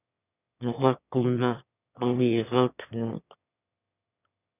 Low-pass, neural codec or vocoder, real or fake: 3.6 kHz; autoencoder, 22.05 kHz, a latent of 192 numbers a frame, VITS, trained on one speaker; fake